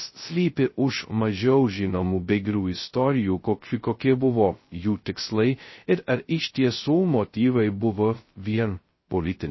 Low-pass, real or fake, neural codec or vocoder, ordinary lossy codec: 7.2 kHz; fake; codec, 16 kHz, 0.2 kbps, FocalCodec; MP3, 24 kbps